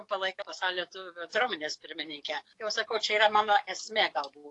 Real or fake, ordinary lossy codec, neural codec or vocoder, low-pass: fake; AAC, 48 kbps; codec, 44.1 kHz, 7.8 kbps, DAC; 10.8 kHz